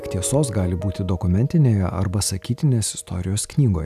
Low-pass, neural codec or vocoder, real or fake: 14.4 kHz; none; real